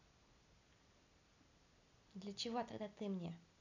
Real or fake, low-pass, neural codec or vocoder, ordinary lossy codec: real; 7.2 kHz; none; none